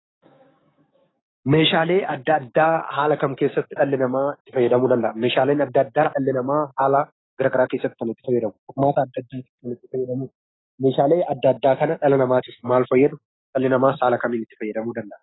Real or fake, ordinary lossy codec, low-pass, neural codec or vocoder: fake; AAC, 16 kbps; 7.2 kHz; codec, 16 kHz, 6 kbps, DAC